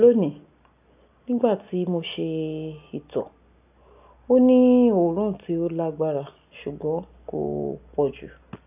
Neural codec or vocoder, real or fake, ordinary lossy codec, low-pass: none; real; AAC, 32 kbps; 3.6 kHz